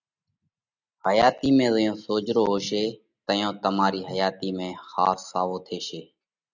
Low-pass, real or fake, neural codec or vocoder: 7.2 kHz; real; none